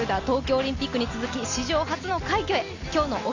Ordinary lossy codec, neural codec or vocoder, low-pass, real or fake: Opus, 64 kbps; none; 7.2 kHz; real